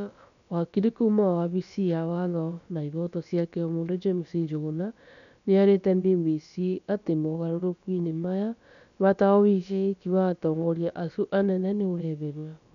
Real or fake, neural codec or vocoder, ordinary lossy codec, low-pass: fake; codec, 16 kHz, about 1 kbps, DyCAST, with the encoder's durations; none; 7.2 kHz